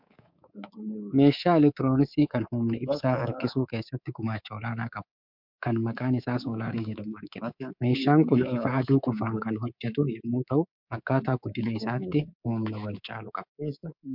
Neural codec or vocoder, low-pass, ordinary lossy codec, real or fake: codec, 24 kHz, 3.1 kbps, DualCodec; 5.4 kHz; MP3, 48 kbps; fake